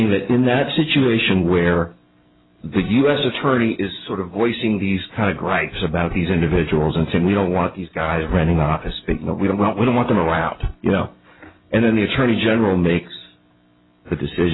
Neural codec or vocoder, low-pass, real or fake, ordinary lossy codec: none; 7.2 kHz; real; AAC, 16 kbps